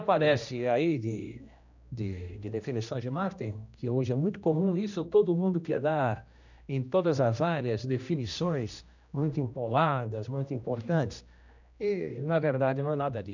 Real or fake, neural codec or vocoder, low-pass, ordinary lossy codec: fake; codec, 16 kHz, 1 kbps, X-Codec, HuBERT features, trained on general audio; 7.2 kHz; none